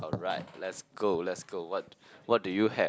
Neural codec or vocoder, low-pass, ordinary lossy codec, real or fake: none; none; none; real